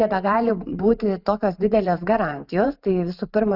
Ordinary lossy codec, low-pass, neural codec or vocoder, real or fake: Opus, 64 kbps; 5.4 kHz; vocoder, 44.1 kHz, 128 mel bands every 512 samples, BigVGAN v2; fake